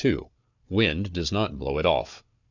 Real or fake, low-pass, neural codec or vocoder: fake; 7.2 kHz; codec, 16 kHz, 4 kbps, FunCodec, trained on Chinese and English, 50 frames a second